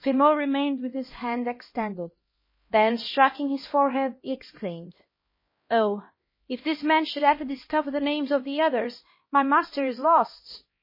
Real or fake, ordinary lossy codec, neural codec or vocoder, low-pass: fake; MP3, 24 kbps; codec, 16 kHz, 1 kbps, X-Codec, HuBERT features, trained on LibriSpeech; 5.4 kHz